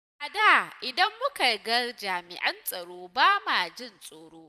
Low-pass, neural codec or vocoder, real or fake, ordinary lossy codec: 14.4 kHz; vocoder, 44.1 kHz, 128 mel bands every 256 samples, BigVGAN v2; fake; none